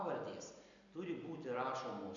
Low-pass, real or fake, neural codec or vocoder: 7.2 kHz; real; none